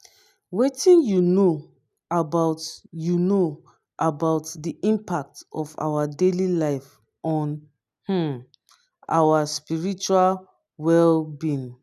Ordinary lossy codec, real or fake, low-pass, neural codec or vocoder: none; real; 14.4 kHz; none